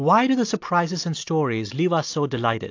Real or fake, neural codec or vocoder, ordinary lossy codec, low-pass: real; none; AAC, 48 kbps; 7.2 kHz